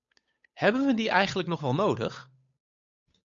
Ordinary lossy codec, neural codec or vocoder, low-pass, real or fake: MP3, 64 kbps; codec, 16 kHz, 8 kbps, FunCodec, trained on Chinese and English, 25 frames a second; 7.2 kHz; fake